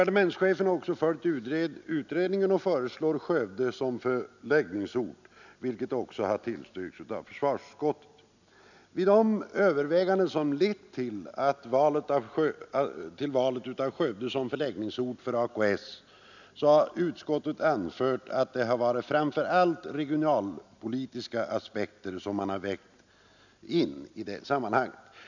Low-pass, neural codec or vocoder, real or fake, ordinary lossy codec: 7.2 kHz; none; real; none